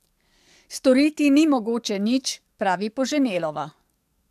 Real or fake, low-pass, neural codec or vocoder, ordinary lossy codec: fake; 14.4 kHz; codec, 44.1 kHz, 7.8 kbps, DAC; MP3, 96 kbps